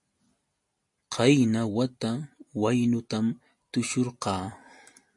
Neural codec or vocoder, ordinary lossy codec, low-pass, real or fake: none; MP3, 48 kbps; 10.8 kHz; real